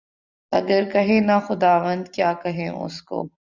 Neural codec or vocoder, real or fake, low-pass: none; real; 7.2 kHz